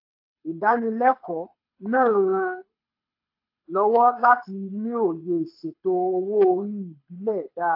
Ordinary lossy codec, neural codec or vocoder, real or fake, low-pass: AAC, 32 kbps; codec, 24 kHz, 6 kbps, HILCodec; fake; 5.4 kHz